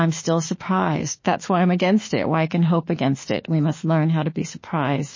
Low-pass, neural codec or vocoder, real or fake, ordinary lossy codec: 7.2 kHz; autoencoder, 48 kHz, 32 numbers a frame, DAC-VAE, trained on Japanese speech; fake; MP3, 32 kbps